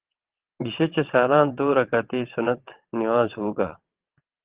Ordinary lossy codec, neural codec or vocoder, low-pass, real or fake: Opus, 16 kbps; vocoder, 22.05 kHz, 80 mel bands, WaveNeXt; 3.6 kHz; fake